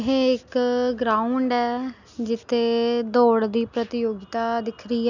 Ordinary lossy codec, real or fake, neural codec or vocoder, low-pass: none; real; none; 7.2 kHz